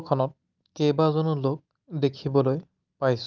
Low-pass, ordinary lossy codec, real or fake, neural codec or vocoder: 7.2 kHz; Opus, 24 kbps; real; none